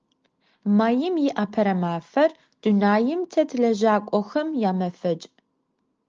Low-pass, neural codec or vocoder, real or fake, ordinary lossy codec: 7.2 kHz; none; real; Opus, 24 kbps